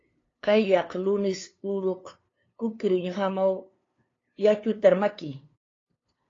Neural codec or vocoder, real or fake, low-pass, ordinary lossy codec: codec, 16 kHz, 2 kbps, FunCodec, trained on LibriTTS, 25 frames a second; fake; 7.2 kHz; AAC, 32 kbps